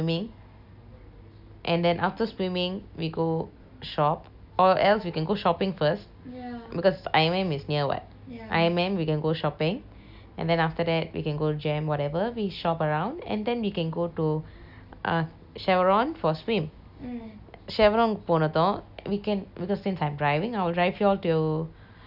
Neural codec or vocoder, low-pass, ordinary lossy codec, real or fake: none; 5.4 kHz; none; real